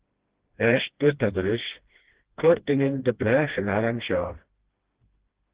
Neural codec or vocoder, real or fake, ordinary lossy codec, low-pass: codec, 16 kHz, 1 kbps, FreqCodec, smaller model; fake; Opus, 16 kbps; 3.6 kHz